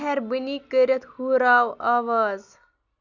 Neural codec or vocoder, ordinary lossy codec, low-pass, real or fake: none; none; 7.2 kHz; real